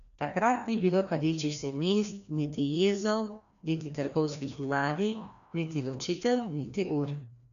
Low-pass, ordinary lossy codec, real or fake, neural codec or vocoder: 7.2 kHz; none; fake; codec, 16 kHz, 1 kbps, FreqCodec, larger model